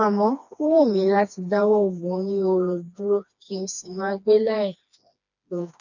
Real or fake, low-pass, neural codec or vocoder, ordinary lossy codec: fake; 7.2 kHz; codec, 16 kHz, 2 kbps, FreqCodec, smaller model; none